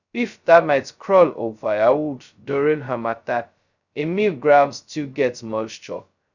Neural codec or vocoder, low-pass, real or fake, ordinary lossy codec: codec, 16 kHz, 0.2 kbps, FocalCodec; 7.2 kHz; fake; none